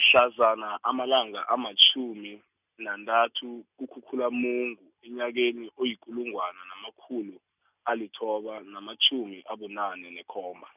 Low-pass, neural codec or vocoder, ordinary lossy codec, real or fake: 3.6 kHz; none; none; real